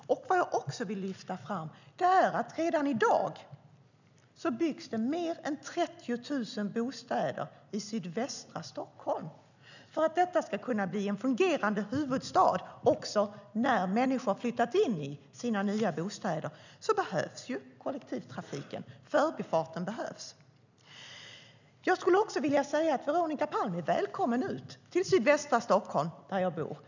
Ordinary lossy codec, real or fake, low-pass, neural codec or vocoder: none; real; 7.2 kHz; none